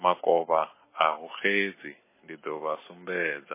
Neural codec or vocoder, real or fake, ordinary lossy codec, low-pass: none; real; MP3, 16 kbps; 3.6 kHz